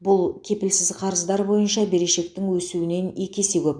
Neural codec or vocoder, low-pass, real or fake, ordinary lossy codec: none; 9.9 kHz; real; none